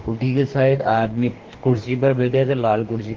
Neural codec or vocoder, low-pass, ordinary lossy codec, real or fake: codec, 44.1 kHz, 2.6 kbps, DAC; 7.2 kHz; Opus, 16 kbps; fake